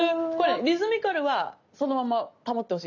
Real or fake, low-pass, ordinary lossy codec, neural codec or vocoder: real; 7.2 kHz; none; none